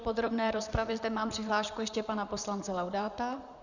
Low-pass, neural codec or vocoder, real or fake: 7.2 kHz; vocoder, 44.1 kHz, 128 mel bands, Pupu-Vocoder; fake